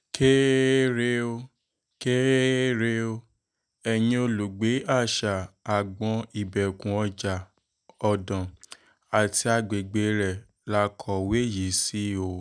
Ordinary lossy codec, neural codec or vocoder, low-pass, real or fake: none; none; 9.9 kHz; real